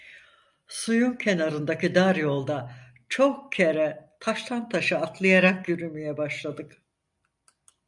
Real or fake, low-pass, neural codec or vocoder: real; 10.8 kHz; none